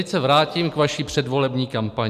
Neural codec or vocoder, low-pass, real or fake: none; 14.4 kHz; real